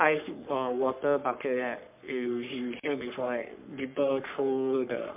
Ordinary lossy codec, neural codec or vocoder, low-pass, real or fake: MP3, 32 kbps; codec, 44.1 kHz, 3.4 kbps, Pupu-Codec; 3.6 kHz; fake